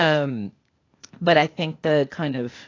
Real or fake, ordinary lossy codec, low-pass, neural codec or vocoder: fake; AAC, 32 kbps; 7.2 kHz; codec, 16 kHz, 0.8 kbps, ZipCodec